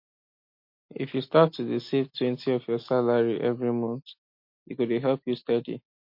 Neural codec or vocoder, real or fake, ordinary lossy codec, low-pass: none; real; MP3, 32 kbps; 5.4 kHz